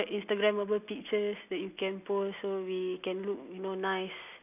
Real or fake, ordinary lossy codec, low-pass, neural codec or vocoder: real; none; 3.6 kHz; none